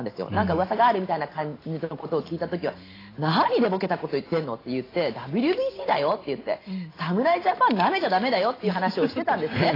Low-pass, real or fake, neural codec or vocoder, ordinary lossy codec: 5.4 kHz; real; none; AAC, 24 kbps